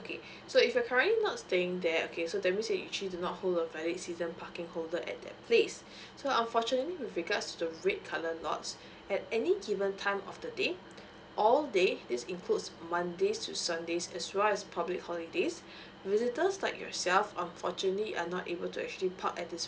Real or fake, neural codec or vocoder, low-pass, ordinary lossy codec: real; none; none; none